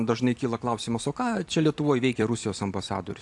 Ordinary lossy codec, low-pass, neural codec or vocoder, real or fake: AAC, 64 kbps; 10.8 kHz; vocoder, 44.1 kHz, 128 mel bands every 256 samples, BigVGAN v2; fake